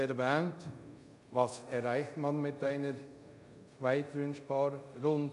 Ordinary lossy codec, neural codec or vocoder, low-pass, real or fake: none; codec, 24 kHz, 0.5 kbps, DualCodec; 10.8 kHz; fake